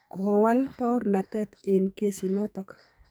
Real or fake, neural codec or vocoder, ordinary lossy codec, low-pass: fake; codec, 44.1 kHz, 2.6 kbps, SNAC; none; none